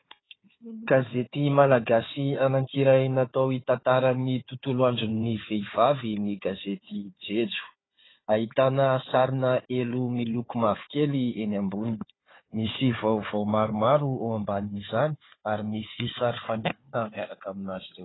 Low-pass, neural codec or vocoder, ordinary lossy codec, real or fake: 7.2 kHz; codec, 16 kHz, 4 kbps, FunCodec, trained on Chinese and English, 50 frames a second; AAC, 16 kbps; fake